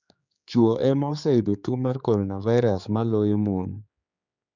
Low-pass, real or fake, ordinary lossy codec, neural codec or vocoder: 7.2 kHz; fake; none; codec, 16 kHz, 4 kbps, X-Codec, HuBERT features, trained on general audio